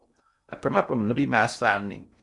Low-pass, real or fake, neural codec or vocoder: 10.8 kHz; fake; codec, 16 kHz in and 24 kHz out, 0.6 kbps, FocalCodec, streaming, 2048 codes